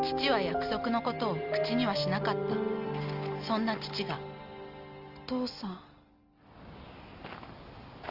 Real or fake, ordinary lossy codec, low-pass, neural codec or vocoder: real; Opus, 24 kbps; 5.4 kHz; none